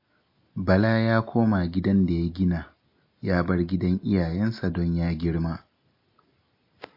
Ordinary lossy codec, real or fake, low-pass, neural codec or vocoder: MP3, 32 kbps; real; 5.4 kHz; none